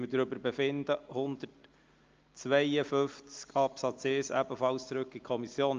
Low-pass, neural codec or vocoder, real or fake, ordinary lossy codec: 7.2 kHz; none; real; Opus, 24 kbps